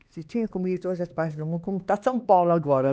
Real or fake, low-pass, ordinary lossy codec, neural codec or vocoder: fake; none; none; codec, 16 kHz, 2 kbps, X-Codec, HuBERT features, trained on LibriSpeech